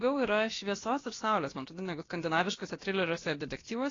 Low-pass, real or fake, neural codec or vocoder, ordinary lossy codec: 7.2 kHz; fake; codec, 16 kHz, about 1 kbps, DyCAST, with the encoder's durations; AAC, 32 kbps